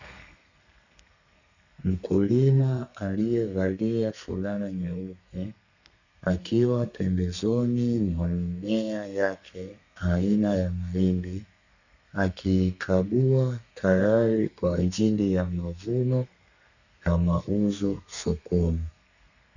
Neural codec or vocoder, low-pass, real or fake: codec, 32 kHz, 1.9 kbps, SNAC; 7.2 kHz; fake